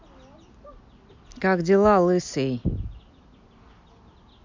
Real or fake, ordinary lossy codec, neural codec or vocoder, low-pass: real; MP3, 64 kbps; none; 7.2 kHz